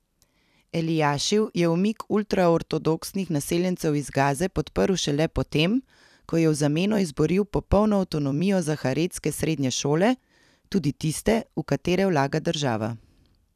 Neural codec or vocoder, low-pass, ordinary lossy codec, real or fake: vocoder, 44.1 kHz, 128 mel bands every 256 samples, BigVGAN v2; 14.4 kHz; none; fake